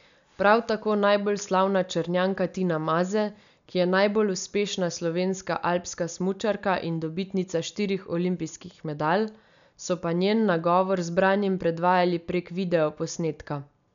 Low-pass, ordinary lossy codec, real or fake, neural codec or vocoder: 7.2 kHz; none; real; none